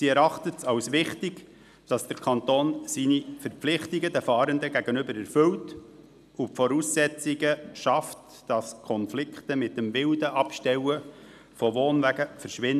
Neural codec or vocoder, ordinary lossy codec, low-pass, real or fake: none; none; 14.4 kHz; real